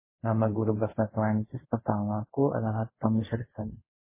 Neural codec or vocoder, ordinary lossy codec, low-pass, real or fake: codec, 24 kHz, 0.5 kbps, DualCodec; MP3, 16 kbps; 3.6 kHz; fake